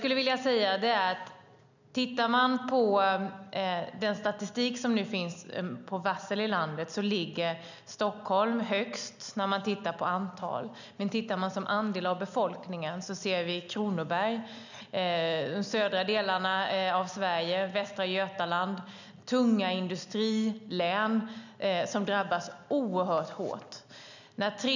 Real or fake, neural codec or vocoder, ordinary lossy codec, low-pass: real; none; none; 7.2 kHz